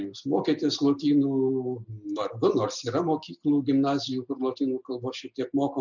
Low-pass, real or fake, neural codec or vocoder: 7.2 kHz; real; none